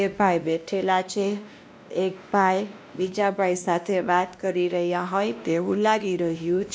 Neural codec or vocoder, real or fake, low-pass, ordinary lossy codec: codec, 16 kHz, 1 kbps, X-Codec, WavLM features, trained on Multilingual LibriSpeech; fake; none; none